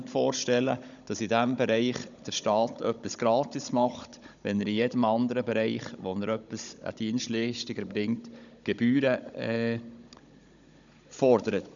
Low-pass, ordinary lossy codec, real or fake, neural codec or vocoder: 7.2 kHz; none; fake; codec, 16 kHz, 16 kbps, FunCodec, trained on Chinese and English, 50 frames a second